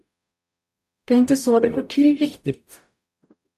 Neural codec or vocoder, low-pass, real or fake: codec, 44.1 kHz, 0.9 kbps, DAC; 14.4 kHz; fake